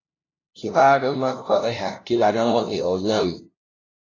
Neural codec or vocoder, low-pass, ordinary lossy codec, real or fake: codec, 16 kHz, 0.5 kbps, FunCodec, trained on LibriTTS, 25 frames a second; 7.2 kHz; AAC, 32 kbps; fake